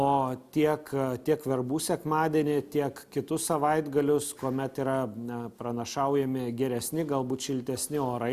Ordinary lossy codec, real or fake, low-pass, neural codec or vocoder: Opus, 64 kbps; real; 14.4 kHz; none